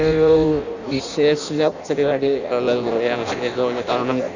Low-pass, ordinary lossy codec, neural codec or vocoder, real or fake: 7.2 kHz; none; codec, 16 kHz in and 24 kHz out, 0.6 kbps, FireRedTTS-2 codec; fake